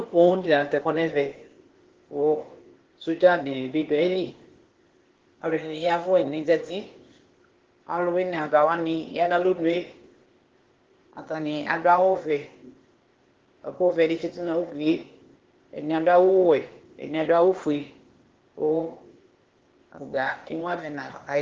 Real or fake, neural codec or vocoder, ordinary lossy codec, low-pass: fake; codec, 16 kHz, 0.8 kbps, ZipCodec; Opus, 16 kbps; 7.2 kHz